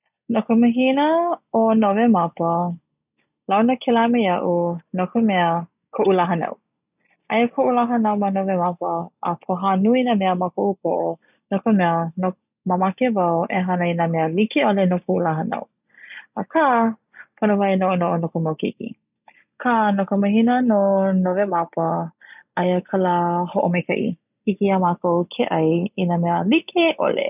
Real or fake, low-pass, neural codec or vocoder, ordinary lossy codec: real; 3.6 kHz; none; none